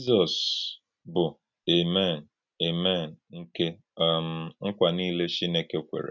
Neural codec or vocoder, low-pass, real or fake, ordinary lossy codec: none; 7.2 kHz; real; none